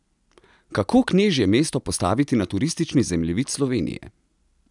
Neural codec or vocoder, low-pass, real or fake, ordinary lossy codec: none; 10.8 kHz; real; none